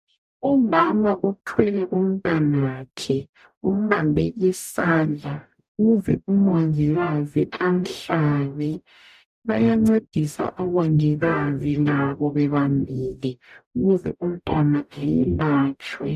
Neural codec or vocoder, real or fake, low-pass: codec, 44.1 kHz, 0.9 kbps, DAC; fake; 14.4 kHz